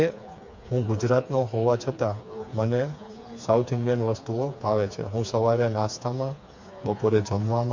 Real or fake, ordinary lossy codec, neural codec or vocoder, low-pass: fake; MP3, 48 kbps; codec, 16 kHz, 4 kbps, FreqCodec, smaller model; 7.2 kHz